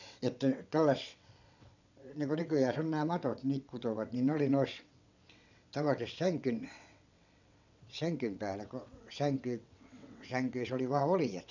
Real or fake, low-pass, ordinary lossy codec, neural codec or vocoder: fake; 7.2 kHz; none; vocoder, 22.05 kHz, 80 mel bands, WaveNeXt